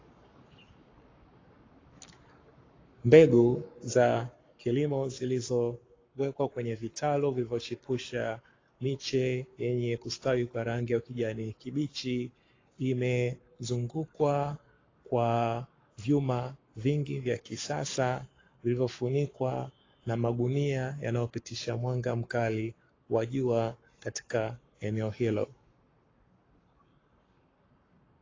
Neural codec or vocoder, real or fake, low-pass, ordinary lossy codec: codec, 44.1 kHz, 7.8 kbps, Pupu-Codec; fake; 7.2 kHz; AAC, 32 kbps